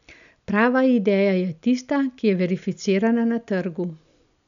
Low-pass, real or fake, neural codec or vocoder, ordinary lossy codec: 7.2 kHz; real; none; none